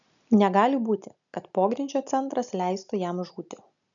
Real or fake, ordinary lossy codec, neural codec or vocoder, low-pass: real; MP3, 96 kbps; none; 7.2 kHz